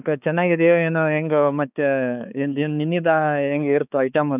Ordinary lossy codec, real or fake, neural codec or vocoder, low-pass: none; fake; codec, 16 kHz, 4 kbps, X-Codec, HuBERT features, trained on balanced general audio; 3.6 kHz